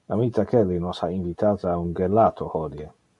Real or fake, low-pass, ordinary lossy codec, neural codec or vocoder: real; 10.8 kHz; MP3, 48 kbps; none